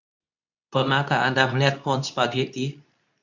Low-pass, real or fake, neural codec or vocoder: 7.2 kHz; fake; codec, 24 kHz, 0.9 kbps, WavTokenizer, medium speech release version 2